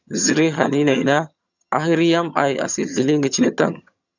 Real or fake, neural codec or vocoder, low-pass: fake; vocoder, 22.05 kHz, 80 mel bands, HiFi-GAN; 7.2 kHz